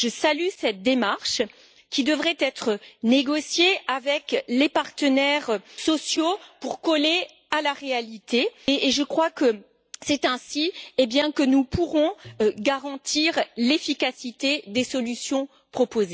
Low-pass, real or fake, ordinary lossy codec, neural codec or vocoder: none; real; none; none